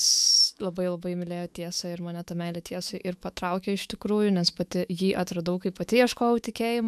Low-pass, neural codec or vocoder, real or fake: 14.4 kHz; autoencoder, 48 kHz, 128 numbers a frame, DAC-VAE, trained on Japanese speech; fake